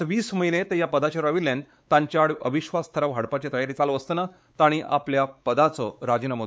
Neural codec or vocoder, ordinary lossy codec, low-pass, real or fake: codec, 16 kHz, 4 kbps, X-Codec, WavLM features, trained on Multilingual LibriSpeech; none; none; fake